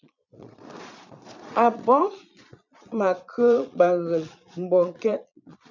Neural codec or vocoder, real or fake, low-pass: vocoder, 22.05 kHz, 80 mel bands, Vocos; fake; 7.2 kHz